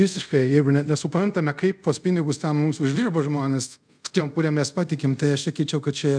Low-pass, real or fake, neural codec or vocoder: 9.9 kHz; fake; codec, 24 kHz, 0.5 kbps, DualCodec